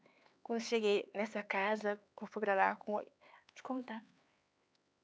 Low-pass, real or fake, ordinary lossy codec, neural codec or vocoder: none; fake; none; codec, 16 kHz, 4 kbps, X-Codec, HuBERT features, trained on LibriSpeech